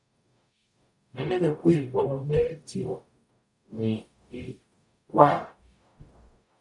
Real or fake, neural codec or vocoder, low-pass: fake; codec, 44.1 kHz, 0.9 kbps, DAC; 10.8 kHz